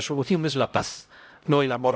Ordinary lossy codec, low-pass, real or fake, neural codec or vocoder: none; none; fake; codec, 16 kHz, 0.5 kbps, X-Codec, HuBERT features, trained on LibriSpeech